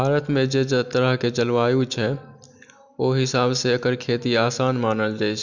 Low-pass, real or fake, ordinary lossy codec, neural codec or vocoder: 7.2 kHz; real; none; none